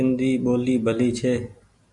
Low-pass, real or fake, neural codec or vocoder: 10.8 kHz; real; none